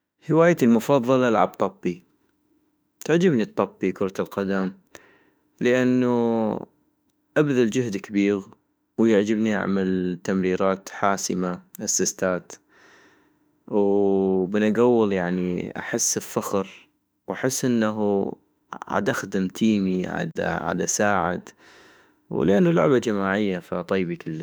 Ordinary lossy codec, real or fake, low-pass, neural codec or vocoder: none; fake; none; autoencoder, 48 kHz, 32 numbers a frame, DAC-VAE, trained on Japanese speech